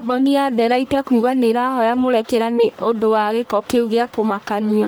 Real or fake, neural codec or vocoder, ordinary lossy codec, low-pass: fake; codec, 44.1 kHz, 1.7 kbps, Pupu-Codec; none; none